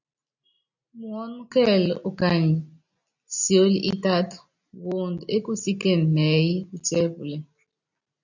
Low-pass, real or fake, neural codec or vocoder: 7.2 kHz; real; none